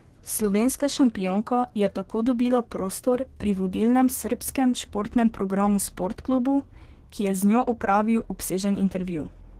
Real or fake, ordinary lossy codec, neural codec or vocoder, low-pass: fake; Opus, 16 kbps; codec, 32 kHz, 1.9 kbps, SNAC; 14.4 kHz